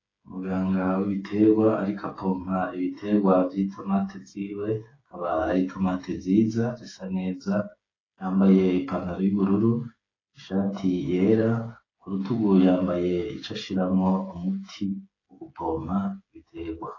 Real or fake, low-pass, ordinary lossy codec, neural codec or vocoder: fake; 7.2 kHz; AAC, 32 kbps; codec, 16 kHz, 8 kbps, FreqCodec, smaller model